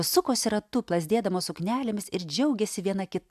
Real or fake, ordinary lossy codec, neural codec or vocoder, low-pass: real; AAC, 96 kbps; none; 14.4 kHz